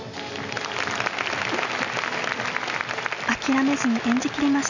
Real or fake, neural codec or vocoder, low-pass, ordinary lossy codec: real; none; 7.2 kHz; none